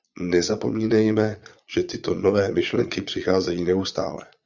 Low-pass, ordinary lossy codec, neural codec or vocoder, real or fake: 7.2 kHz; Opus, 64 kbps; vocoder, 22.05 kHz, 80 mel bands, Vocos; fake